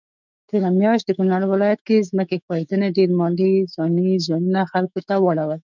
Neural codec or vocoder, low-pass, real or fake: autoencoder, 48 kHz, 128 numbers a frame, DAC-VAE, trained on Japanese speech; 7.2 kHz; fake